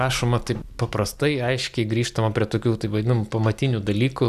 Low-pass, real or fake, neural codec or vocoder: 14.4 kHz; real; none